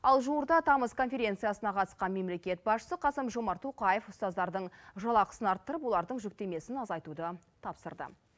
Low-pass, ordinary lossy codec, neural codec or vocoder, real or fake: none; none; none; real